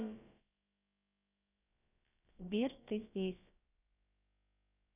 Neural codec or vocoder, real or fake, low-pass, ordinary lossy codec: codec, 16 kHz, about 1 kbps, DyCAST, with the encoder's durations; fake; 3.6 kHz; none